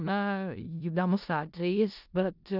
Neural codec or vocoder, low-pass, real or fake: codec, 16 kHz in and 24 kHz out, 0.4 kbps, LongCat-Audio-Codec, four codebook decoder; 5.4 kHz; fake